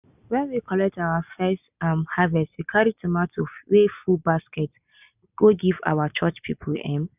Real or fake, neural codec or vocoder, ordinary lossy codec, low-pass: real; none; none; 3.6 kHz